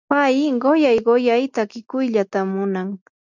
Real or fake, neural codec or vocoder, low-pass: real; none; 7.2 kHz